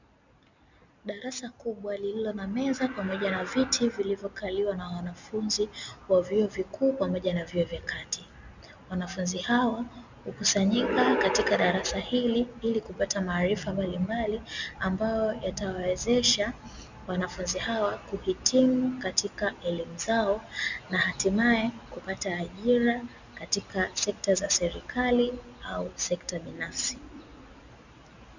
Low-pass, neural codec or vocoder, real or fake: 7.2 kHz; none; real